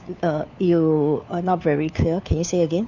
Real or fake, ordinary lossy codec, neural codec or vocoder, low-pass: fake; none; codec, 16 kHz, 4 kbps, FunCodec, trained on LibriTTS, 50 frames a second; 7.2 kHz